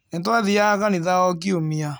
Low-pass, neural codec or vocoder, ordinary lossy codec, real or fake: none; none; none; real